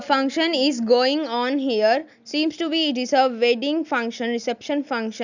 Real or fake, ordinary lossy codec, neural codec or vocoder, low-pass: real; none; none; 7.2 kHz